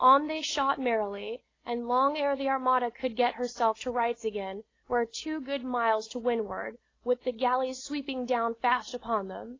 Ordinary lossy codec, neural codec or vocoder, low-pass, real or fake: AAC, 32 kbps; none; 7.2 kHz; real